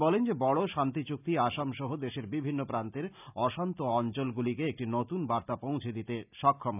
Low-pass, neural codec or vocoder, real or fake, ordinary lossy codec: 3.6 kHz; none; real; none